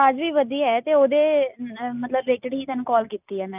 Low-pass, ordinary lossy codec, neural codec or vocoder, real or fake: 3.6 kHz; none; none; real